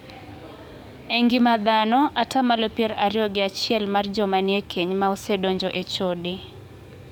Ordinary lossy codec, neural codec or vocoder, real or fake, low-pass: none; codec, 44.1 kHz, 7.8 kbps, DAC; fake; 19.8 kHz